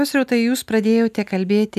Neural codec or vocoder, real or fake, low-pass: none; real; 14.4 kHz